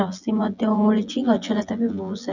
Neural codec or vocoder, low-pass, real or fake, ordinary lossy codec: vocoder, 24 kHz, 100 mel bands, Vocos; 7.2 kHz; fake; none